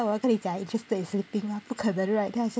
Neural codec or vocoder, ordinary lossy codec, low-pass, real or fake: none; none; none; real